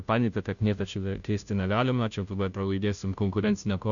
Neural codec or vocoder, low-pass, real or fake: codec, 16 kHz, 0.5 kbps, FunCodec, trained on Chinese and English, 25 frames a second; 7.2 kHz; fake